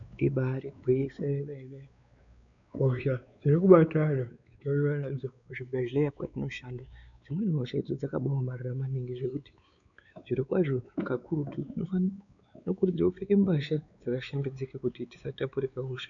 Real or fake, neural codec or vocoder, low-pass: fake; codec, 16 kHz, 4 kbps, X-Codec, WavLM features, trained on Multilingual LibriSpeech; 7.2 kHz